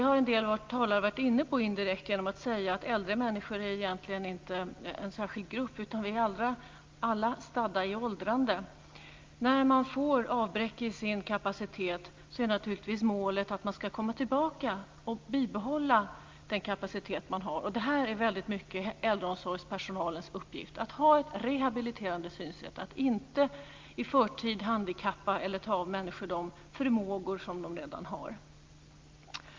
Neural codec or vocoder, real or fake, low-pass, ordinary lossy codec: none; real; 7.2 kHz; Opus, 24 kbps